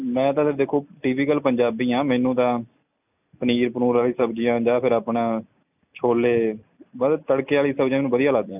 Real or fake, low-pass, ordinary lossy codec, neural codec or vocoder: real; 3.6 kHz; none; none